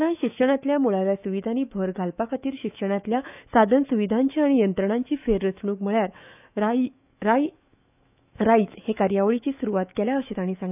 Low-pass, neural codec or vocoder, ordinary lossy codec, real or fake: 3.6 kHz; autoencoder, 48 kHz, 128 numbers a frame, DAC-VAE, trained on Japanese speech; none; fake